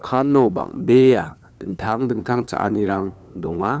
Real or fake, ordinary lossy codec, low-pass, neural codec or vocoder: fake; none; none; codec, 16 kHz, 2 kbps, FunCodec, trained on LibriTTS, 25 frames a second